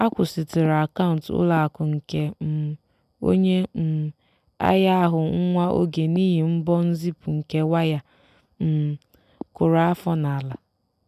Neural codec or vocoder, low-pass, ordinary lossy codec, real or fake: none; 14.4 kHz; none; real